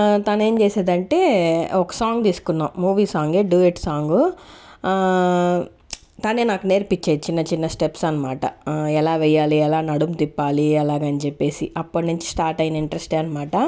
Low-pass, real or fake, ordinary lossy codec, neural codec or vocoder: none; real; none; none